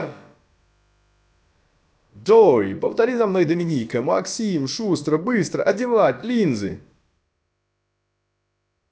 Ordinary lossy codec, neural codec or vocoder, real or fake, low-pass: none; codec, 16 kHz, about 1 kbps, DyCAST, with the encoder's durations; fake; none